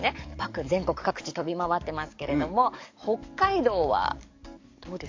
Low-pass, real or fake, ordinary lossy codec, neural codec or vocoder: 7.2 kHz; fake; AAC, 48 kbps; codec, 44.1 kHz, 7.8 kbps, DAC